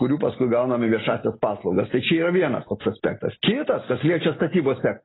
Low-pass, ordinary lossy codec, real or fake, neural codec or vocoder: 7.2 kHz; AAC, 16 kbps; real; none